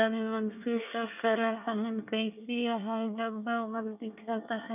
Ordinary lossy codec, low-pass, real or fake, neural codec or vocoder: none; 3.6 kHz; fake; codec, 24 kHz, 1 kbps, SNAC